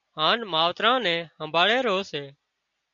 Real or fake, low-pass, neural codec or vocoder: real; 7.2 kHz; none